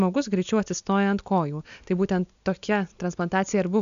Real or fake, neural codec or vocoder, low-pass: real; none; 7.2 kHz